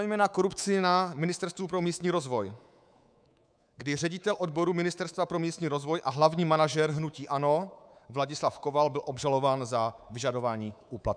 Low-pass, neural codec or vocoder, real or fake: 9.9 kHz; codec, 24 kHz, 3.1 kbps, DualCodec; fake